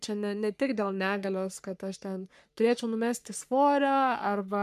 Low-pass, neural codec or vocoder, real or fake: 14.4 kHz; codec, 44.1 kHz, 3.4 kbps, Pupu-Codec; fake